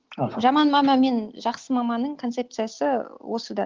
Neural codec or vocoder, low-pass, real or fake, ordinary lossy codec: none; 7.2 kHz; real; Opus, 32 kbps